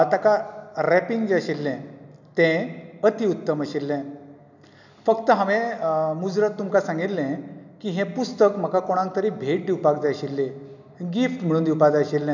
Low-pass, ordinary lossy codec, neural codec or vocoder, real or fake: 7.2 kHz; none; none; real